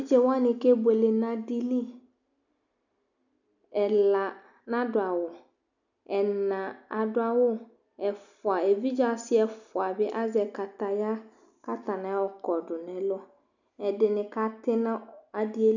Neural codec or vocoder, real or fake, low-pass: none; real; 7.2 kHz